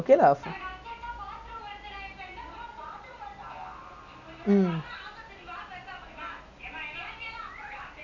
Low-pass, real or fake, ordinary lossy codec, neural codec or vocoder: 7.2 kHz; real; none; none